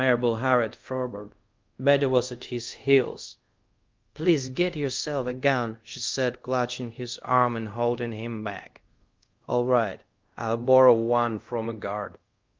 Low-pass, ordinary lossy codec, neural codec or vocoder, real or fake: 7.2 kHz; Opus, 32 kbps; codec, 24 kHz, 0.5 kbps, DualCodec; fake